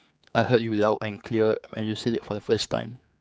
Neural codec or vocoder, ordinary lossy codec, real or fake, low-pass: codec, 16 kHz, 4 kbps, X-Codec, HuBERT features, trained on general audio; none; fake; none